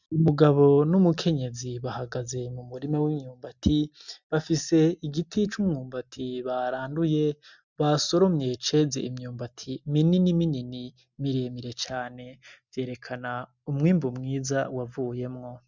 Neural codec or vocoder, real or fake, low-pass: none; real; 7.2 kHz